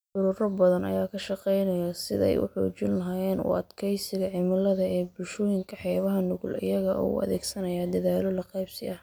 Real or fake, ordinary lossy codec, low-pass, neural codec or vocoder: real; none; none; none